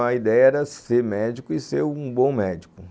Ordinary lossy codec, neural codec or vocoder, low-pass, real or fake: none; none; none; real